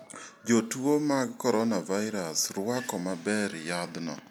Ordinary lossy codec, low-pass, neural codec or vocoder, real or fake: none; none; none; real